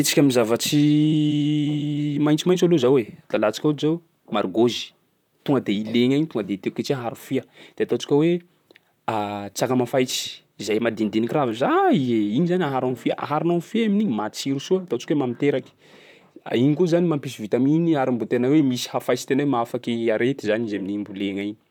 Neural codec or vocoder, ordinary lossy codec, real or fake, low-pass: vocoder, 44.1 kHz, 128 mel bands, Pupu-Vocoder; none; fake; 19.8 kHz